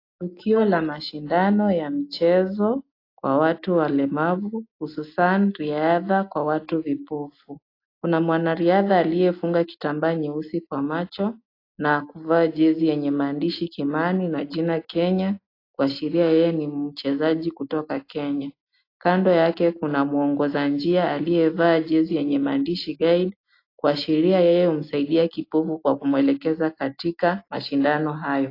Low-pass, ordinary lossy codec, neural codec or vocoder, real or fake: 5.4 kHz; AAC, 32 kbps; none; real